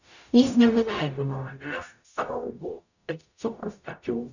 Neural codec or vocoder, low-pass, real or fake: codec, 44.1 kHz, 0.9 kbps, DAC; 7.2 kHz; fake